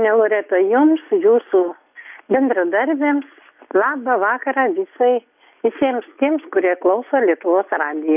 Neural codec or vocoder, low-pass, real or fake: none; 3.6 kHz; real